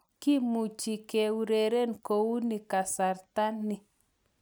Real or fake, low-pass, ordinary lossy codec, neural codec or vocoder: real; none; none; none